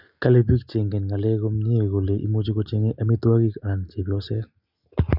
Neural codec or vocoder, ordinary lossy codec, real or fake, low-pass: none; Opus, 64 kbps; real; 5.4 kHz